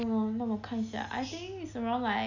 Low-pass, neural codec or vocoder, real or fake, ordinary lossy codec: 7.2 kHz; none; real; none